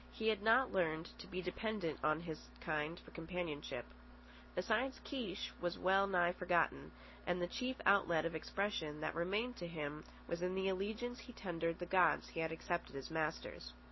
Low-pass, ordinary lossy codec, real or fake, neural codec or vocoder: 7.2 kHz; MP3, 24 kbps; real; none